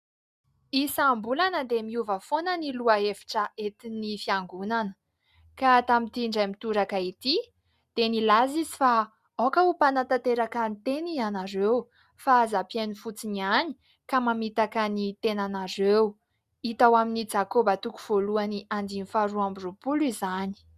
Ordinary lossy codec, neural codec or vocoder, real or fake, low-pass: Opus, 64 kbps; none; real; 14.4 kHz